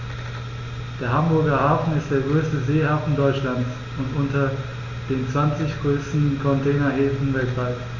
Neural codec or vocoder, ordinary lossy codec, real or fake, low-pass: none; Opus, 64 kbps; real; 7.2 kHz